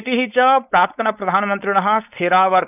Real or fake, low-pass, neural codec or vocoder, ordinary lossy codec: fake; 3.6 kHz; codec, 16 kHz, 4.8 kbps, FACodec; none